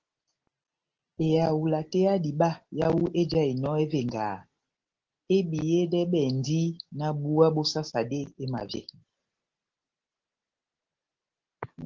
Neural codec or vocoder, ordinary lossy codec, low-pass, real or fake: none; Opus, 16 kbps; 7.2 kHz; real